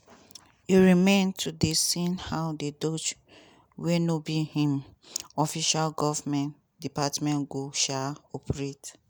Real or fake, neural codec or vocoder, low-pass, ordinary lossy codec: real; none; none; none